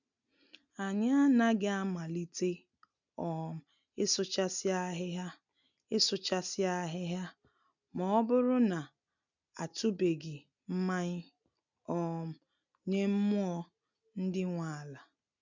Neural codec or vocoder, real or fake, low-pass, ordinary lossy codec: none; real; 7.2 kHz; none